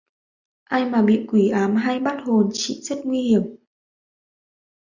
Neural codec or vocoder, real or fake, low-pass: none; real; 7.2 kHz